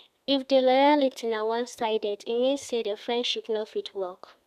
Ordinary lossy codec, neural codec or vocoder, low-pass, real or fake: none; codec, 32 kHz, 1.9 kbps, SNAC; 14.4 kHz; fake